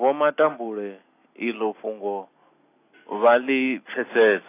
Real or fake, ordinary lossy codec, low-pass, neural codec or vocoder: real; AAC, 24 kbps; 3.6 kHz; none